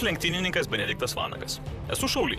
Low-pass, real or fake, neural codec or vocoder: 14.4 kHz; fake; vocoder, 44.1 kHz, 128 mel bands, Pupu-Vocoder